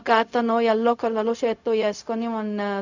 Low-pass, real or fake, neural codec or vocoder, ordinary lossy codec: 7.2 kHz; fake; codec, 16 kHz, 0.4 kbps, LongCat-Audio-Codec; none